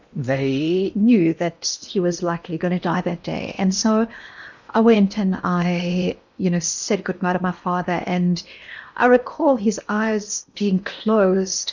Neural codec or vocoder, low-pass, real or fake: codec, 16 kHz in and 24 kHz out, 0.8 kbps, FocalCodec, streaming, 65536 codes; 7.2 kHz; fake